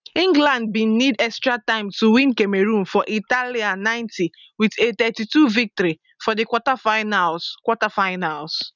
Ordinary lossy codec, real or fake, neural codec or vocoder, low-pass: none; real; none; 7.2 kHz